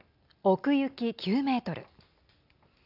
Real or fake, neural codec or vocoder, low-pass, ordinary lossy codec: real; none; 5.4 kHz; AAC, 48 kbps